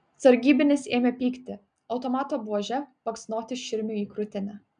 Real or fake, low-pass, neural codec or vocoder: real; 9.9 kHz; none